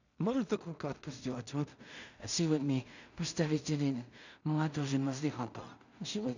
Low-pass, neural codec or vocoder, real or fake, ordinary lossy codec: 7.2 kHz; codec, 16 kHz in and 24 kHz out, 0.4 kbps, LongCat-Audio-Codec, two codebook decoder; fake; none